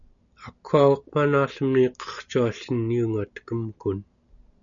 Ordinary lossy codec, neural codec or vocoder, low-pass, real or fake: MP3, 96 kbps; none; 7.2 kHz; real